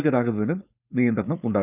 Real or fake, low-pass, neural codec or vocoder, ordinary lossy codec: fake; 3.6 kHz; codec, 16 kHz, 4.8 kbps, FACodec; none